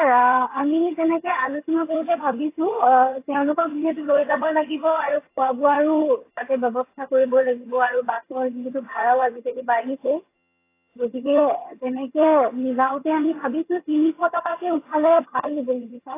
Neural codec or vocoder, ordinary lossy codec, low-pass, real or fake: vocoder, 22.05 kHz, 80 mel bands, HiFi-GAN; AAC, 24 kbps; 3.6 kHz; fake